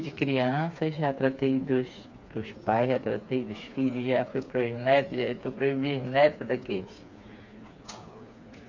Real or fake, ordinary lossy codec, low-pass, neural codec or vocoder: fake; MP3, 48 kbps; 7.2 kHz; codec, 16 kHz, 4 kbps, FreqCodec, smaller model